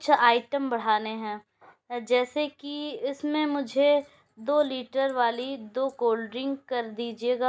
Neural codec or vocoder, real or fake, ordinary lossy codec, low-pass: none; real; none; none